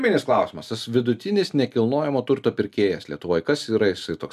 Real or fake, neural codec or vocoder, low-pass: real; none; 14.4 kHz